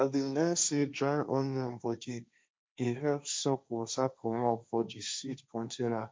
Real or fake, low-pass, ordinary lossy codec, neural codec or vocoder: fake; none; none; codec, 16 kHz, 1.1 kbps, Voila-Tokenizer